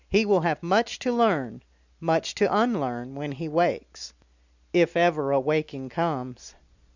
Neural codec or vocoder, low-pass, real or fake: none; 7.2 kHz; real